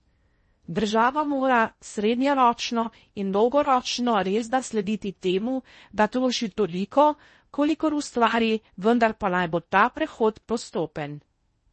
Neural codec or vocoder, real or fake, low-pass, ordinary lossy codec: codec, 16 kHz in and 24 kHz out, 0.6 kbps, FocalCodec, streaming, 2048 codes; fake; 10.8 kHz; MP3, 32 kbps